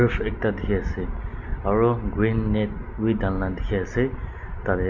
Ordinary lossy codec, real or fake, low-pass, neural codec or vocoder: none; real; 7.2 kHz; none